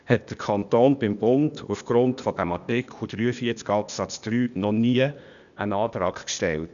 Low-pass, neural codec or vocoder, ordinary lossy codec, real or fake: 7.2 kHz; codec, 16 kHz, 0.8 kbps, ZipCodec; MP3, 96 kbps; fake